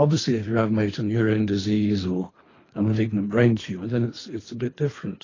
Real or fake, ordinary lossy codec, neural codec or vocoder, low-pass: fake; AAC, 32 kbps; codec, 24 kHz, 3 kbps, HILCodec; 7.2 kHz